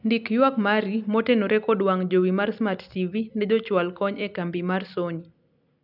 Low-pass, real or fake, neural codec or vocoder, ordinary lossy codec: 5.4 kHz; real; none; none